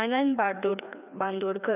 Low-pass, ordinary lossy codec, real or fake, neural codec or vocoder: 3.6 kHz; none; fake; codec, 16 kHz, 2 kbps, FreqCodec, larger model